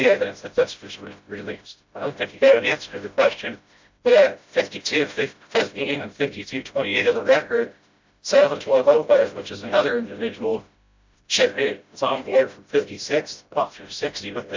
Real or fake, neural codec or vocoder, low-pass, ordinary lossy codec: fake; codec, 16 kHz, 0.5 kbps, FreqCodec, smaller model; 7.2 kHz; MP3, 64 kbps